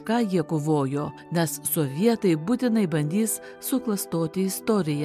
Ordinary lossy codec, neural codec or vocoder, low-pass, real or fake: MP3, 96 kbps; none; 14.4 kHz; real